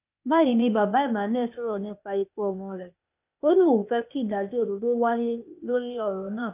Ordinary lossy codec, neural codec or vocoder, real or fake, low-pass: none; codec, 16 kHz, 0.8 kbps, ZipCodec; fake; 3.6 kHz